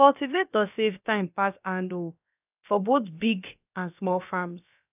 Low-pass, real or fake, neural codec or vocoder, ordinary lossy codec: 3.6 kHz; fake; codec, 16 kHz, about 1 kbps, DyCAST, with the encoder's durations; none